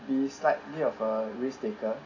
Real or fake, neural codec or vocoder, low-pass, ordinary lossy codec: real; none; 7.2 kHz; none